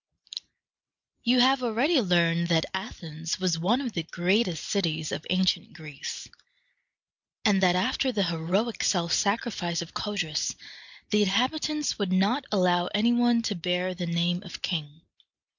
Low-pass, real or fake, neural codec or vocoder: 7.2 kHz; real; none